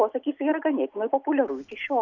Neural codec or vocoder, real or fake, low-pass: none; real; 7.2 kHz